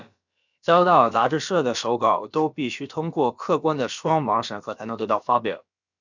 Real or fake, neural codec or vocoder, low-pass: fake; codec, 16 kHz, about 1 kbps, DyCAST, with the encoder's durations; 7.2 kHz